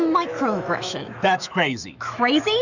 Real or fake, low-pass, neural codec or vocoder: fake; 7.2 kHz; autoencoder, 48 kHz, 128 numbers a frame, DAC-VAE, trained on Japanese speech